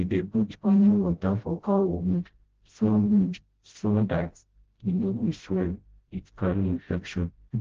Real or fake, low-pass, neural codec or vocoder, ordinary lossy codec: fake; 7.2 kHz; codec, 16 kHz, 0.5 kbps, FreqCodec, smaller model; Opus, 16 kbps